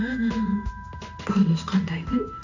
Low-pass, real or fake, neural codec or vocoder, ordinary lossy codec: 7.2 kHz; fake; codec, 16 kHz in and 24 kHz out, 1 kbps, XY-Tokenizer; none